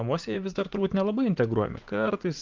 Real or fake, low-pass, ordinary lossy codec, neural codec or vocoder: fake; 7.2 kHz; Opus, 32 kbps; vocoder, 44.1 kHz, 80 mel bands, Vocos